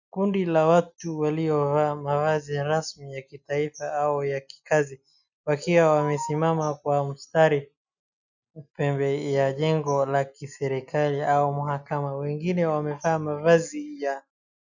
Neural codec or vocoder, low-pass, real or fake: none; 7.2 kHz; real